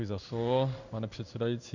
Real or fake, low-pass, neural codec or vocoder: fake; 7.2 kHz; codec, 16 kHz in and 24 kHz out, 1 kbps, XY-Tokenizer